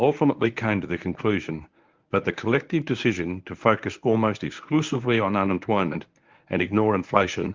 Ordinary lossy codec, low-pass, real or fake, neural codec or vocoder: Opus, 24 kbps; 7.2 kHz; fake; codec, 24 kHz, 0.9 kbps, WavTokenizer, medium speech release version 1